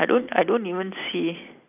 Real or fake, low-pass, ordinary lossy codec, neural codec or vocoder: real; 3.6 kHz; none; none